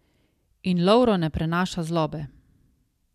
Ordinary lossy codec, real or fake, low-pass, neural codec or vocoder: MP3, 96 kbps; real; 14.4 kHz; none